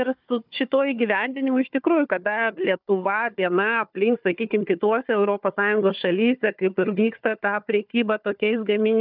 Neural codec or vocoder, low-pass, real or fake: codec, 16 kHz, 4 kbps, FunCodec, trained on Chinese and English, 50 frames a second; 5.4 kHz; fake